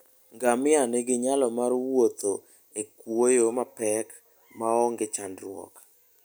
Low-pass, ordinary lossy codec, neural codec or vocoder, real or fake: none; none; none; real